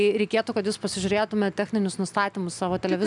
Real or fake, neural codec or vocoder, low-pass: real; none; 10.8 kHz